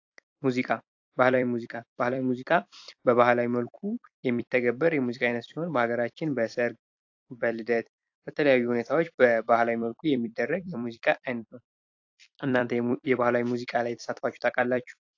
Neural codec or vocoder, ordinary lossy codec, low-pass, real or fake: vocoder, 44.1 kHz, 128 mel bands every 256 samples, BigVGAN v2; AAC, 48 kbps; 7.2 kHz; fake